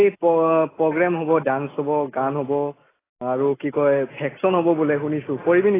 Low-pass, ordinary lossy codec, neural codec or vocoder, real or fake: 3.6 kHz; AAC, 16 kbps; none; real